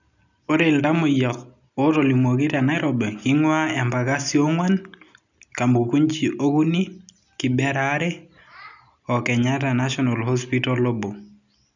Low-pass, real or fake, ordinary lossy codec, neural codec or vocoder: 7.2 kHz; real; none; none